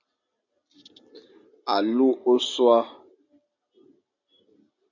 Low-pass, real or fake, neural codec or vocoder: 7.2 kHz; real; none